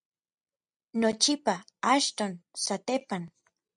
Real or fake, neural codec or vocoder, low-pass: real; none; 9.9 kHz